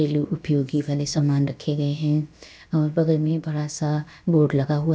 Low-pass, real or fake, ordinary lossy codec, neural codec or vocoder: none; fake; none; codec, 16 kHz, about 1 kbps, DyCAST, with the encoder's durations